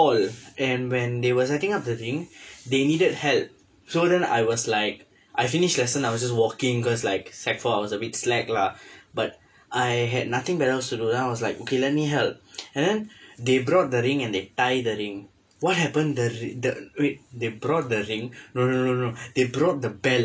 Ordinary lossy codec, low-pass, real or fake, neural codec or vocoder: none; none; real; none